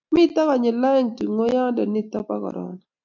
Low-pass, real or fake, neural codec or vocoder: 7.2 kHz; real; none